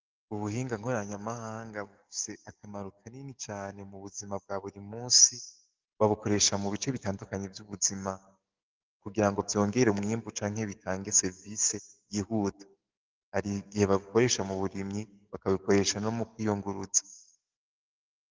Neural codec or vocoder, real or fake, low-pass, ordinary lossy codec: codec, 44.1 kHz, 7.8 kbps, DAC; fake; 7.2 kHz; Opus, 32 kbps